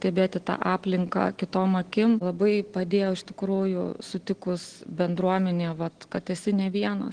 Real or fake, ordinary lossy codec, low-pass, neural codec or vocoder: real; Opus, 16 kbps; 9.9 kHz; none